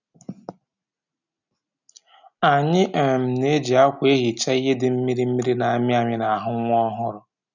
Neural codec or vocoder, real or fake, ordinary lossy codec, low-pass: none; real; none; 7.2 kHz